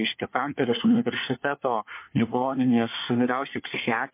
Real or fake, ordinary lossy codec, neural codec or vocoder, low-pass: fake; MP3, 24 kbps; codec, 24 kHz, 1 kbps, SNAC; 3.6 kHz